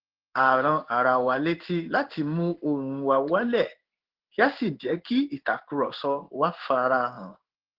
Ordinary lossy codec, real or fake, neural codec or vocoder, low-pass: Opus, 16 kbps; fake; codec, 16 kHz in and 24 kHz out, 1 kbps, XY-Tokenizer; 5.4 kHz